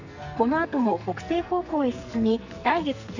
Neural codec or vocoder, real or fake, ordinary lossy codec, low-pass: codec, 44.1 kHz, 2.6 kbps, SNAC; fake; none; 7.2 kHz